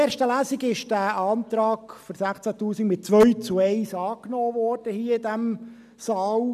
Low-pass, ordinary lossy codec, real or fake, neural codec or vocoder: 14.4 kHz; none; real; none